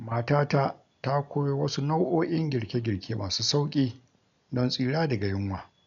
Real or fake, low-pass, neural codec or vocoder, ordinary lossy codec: real; 7.2 kHz; none; MP3, 64 kbps